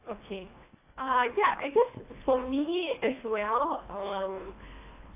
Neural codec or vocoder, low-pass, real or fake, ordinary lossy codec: codec, 24 kHz, 1.5 kbps, HILCodec; 3.6 kHz; fake; AAC, 24 kbps